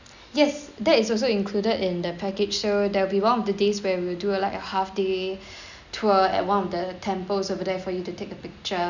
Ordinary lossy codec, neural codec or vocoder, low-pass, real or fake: none; none; 7.2 kHz; real